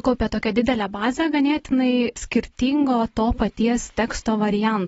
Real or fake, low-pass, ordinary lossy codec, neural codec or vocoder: real; 9.9 kHz; AAC, 24 kbps; none